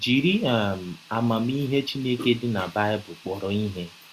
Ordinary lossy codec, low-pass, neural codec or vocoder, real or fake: Opus, 64 kbps; 14.4 kHz; none; real